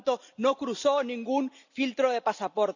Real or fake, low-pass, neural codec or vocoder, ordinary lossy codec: real; 7.2 kHz; none; none